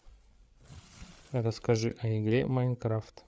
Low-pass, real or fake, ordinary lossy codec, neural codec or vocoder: none; fake; none; codec, 16 kHz, 8 kbps, FreqCodec, larger model